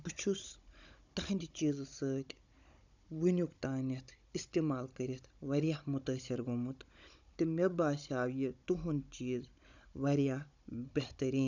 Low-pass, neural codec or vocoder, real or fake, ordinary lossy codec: 7.2 kHz; codec, 16 kHz, 16 kbps, FunCodec, trained on Chinese and English, 50 frames a second; fake; none